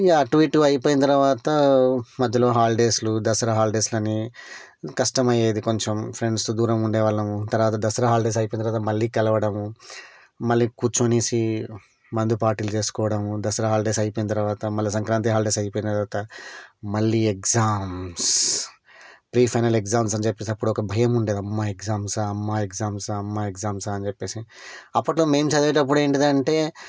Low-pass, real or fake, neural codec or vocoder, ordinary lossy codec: none; real; none; none